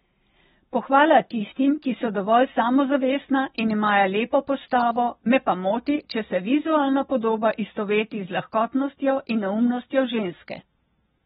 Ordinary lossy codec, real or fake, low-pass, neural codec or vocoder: AAC, 16 kbps; real; 19.8 kHz; none